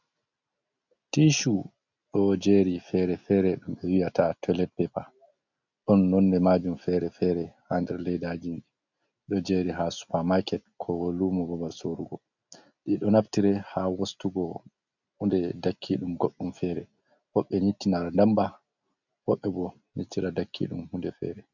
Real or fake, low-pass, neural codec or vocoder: real; 7.2 kHz; none